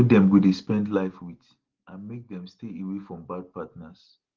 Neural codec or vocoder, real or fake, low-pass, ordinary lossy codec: none; real; 7.2 kHz; Opus, 16 kbps